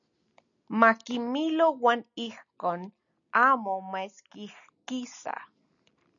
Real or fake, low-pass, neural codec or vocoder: real; 7.2 kHz; none